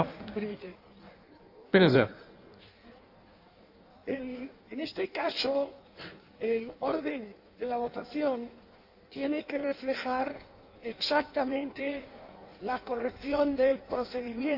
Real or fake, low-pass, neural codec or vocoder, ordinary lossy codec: fake; 5.4 kHz; codec, 16 kHz in and 24 kHz out, 1.1 kbps, FireRedTTS-2 codec; none